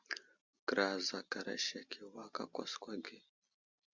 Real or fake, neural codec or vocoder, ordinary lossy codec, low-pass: real; none; Opus, 64 kbps; 7.2 kHz